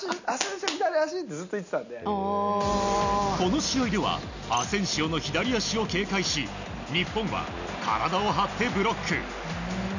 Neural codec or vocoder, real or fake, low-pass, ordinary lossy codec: none; real; 7.2 kHz; none